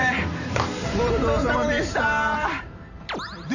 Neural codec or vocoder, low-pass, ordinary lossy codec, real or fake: vocoder, 44.1 kHz, 128 mel bands every 512 samples, BigVGAN v2; 7.2 kHz; none; fake